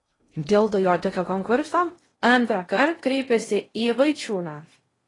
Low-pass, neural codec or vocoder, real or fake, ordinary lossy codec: 10.8 kHz; codec, 16 kHz in and 24 kHz out, 0.6 kbps, FocalCodec, streaming, 2048 codes; fake; AAC, 32 kbps